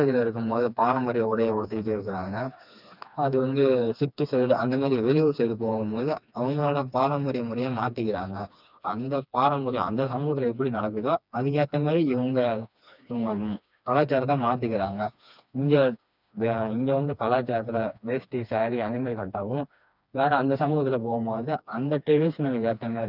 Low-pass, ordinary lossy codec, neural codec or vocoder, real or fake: 5.4 kHz; none; codec, 16 kHz, 2 kbps, FreqCodec, smaller model; fake